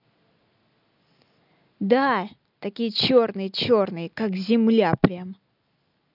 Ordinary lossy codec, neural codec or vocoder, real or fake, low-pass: none; none; real; 5.4 kHz